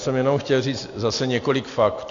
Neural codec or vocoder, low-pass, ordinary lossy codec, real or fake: none; 7.2 kHz; AAC, 48 kbps; real